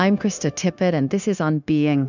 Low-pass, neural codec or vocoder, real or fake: 7.2 kHz; codec, 16 kHz, 0.9 kbps, LongCat-Audio-Codec; fake